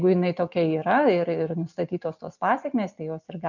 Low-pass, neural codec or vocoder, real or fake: 7.2 kHz; none; real